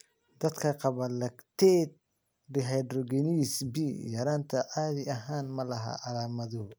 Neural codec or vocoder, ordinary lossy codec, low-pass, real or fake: none; none; none; real